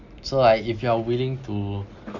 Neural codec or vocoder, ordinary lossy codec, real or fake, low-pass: none; Opus, 64 kbps; real; 7.2 kHz